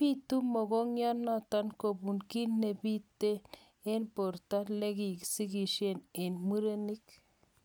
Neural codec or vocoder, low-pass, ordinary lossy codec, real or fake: none; none; none; real